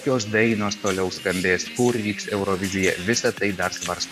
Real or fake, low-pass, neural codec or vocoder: fake; 14.4 kHz; vocoder, 44.1 kHz, 128 mel bands every 512 samples, BigVGAN v2